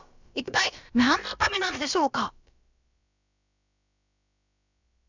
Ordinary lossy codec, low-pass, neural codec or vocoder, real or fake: none; 7.2 kHz; codec, 16 kHz, about 1 kbps, DyCAST, with the encoder's durations; fake